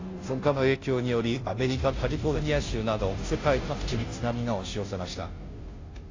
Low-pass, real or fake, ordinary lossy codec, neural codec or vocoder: 7.2 kHz; fake; AAC, 32 kbps; codec, 16 kHz, 0.5 kbps, FunCodec, trained on Chinese and English, 25 frames a second